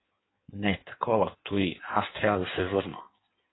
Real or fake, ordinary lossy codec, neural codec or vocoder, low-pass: fake; AAC, 16 kbps; codec, 16 kHz in and 24 kHz out, 1.1 kbps, FireRedTTS-2 codec; 7.2 kHz